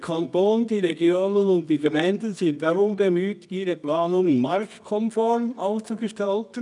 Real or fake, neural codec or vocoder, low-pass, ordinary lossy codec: fake; codec, 24 kHz, 0.9 kbps, WavTokenizer, medium music audio release; 10.8 kHz; none